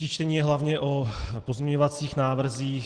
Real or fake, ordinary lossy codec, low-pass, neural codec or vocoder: real; Opus, 16 kbps; 9.9 kHz; none